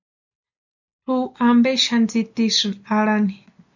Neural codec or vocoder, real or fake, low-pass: none; real; 7.2 kHz